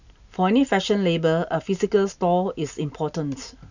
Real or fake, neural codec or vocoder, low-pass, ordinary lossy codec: real; none; 7.2 kHz; none